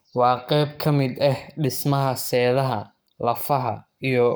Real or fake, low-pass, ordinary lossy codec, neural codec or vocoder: fake; none; none; codec, 44.1 kHz, 7.8 kbps, Pupu-Codec